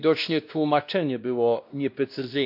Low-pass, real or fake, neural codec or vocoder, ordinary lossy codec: 5.4 kHz; fake; codec, 16 kHz, 1 kbps, X-Codec, WavLM features, trained on Multilingual LibriSpeech; MP3, 48 kbps